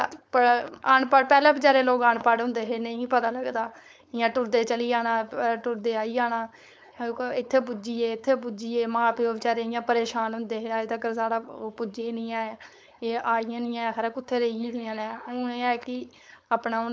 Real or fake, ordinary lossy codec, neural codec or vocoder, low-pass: fake; none; codec, 16 kHz, 4.8 kbps, FACodec; none